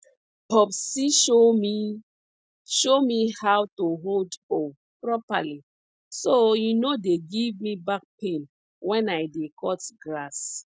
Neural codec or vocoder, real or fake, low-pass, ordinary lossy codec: none; real; none; none